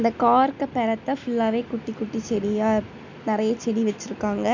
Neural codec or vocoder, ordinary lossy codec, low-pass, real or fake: none; none; 7.2 kHz; real